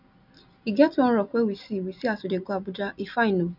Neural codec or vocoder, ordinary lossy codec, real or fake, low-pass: none; none; real; 5.4 kHz